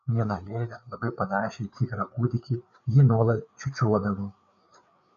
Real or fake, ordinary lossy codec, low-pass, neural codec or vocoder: fake; MP3, 96 kbps; 7.2 kHz; codec, 16 kHz, 4 kbps, FreqCodec, larger model